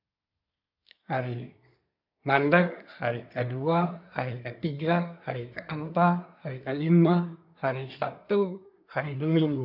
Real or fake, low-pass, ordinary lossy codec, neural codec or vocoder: fake; 5.4 kHz; none; codec, 24 kHz, 1 kbps, SNAC